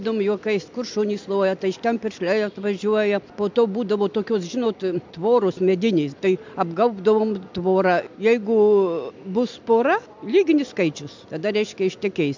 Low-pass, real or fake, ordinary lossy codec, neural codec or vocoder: 7.2 kHz; real; MP3, 64 kbps; none